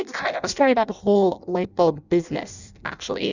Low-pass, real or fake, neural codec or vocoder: 7.2 kHz; fake; codec, 16 kHz in and 24 kHz out, 0.6 kbps, FireRedTTS-2 codec